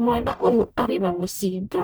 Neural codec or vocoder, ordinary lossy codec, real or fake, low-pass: codec, 44.1 kHz, 0.9 kbps, DAC; none; fake; none